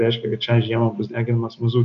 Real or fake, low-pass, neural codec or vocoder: real; 7.2 kHz; none